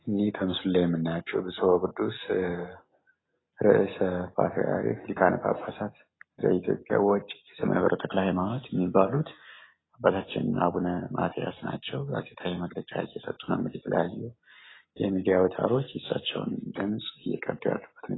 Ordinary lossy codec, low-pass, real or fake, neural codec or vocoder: AAC, 16 kbps; 7.2 kHz; real; none